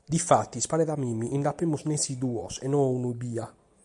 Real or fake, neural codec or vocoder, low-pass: real; none; 10.8 kHz